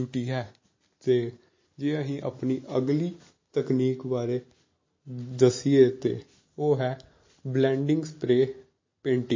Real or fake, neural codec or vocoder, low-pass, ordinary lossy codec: real; none; 7.2 kHz; MP3, 32 kbps